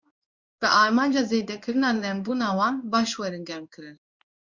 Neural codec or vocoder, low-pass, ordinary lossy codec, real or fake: codec, 16 kHz in and 24 kHz out, 1 kbps, XY-Tokenizer; 7.2 kHz; Opus, 64 kbps; fake